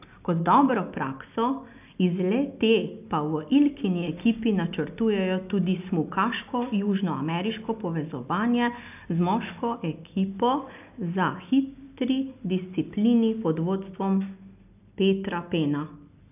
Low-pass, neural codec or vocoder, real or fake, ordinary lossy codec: 3.6 kHz; none; real; none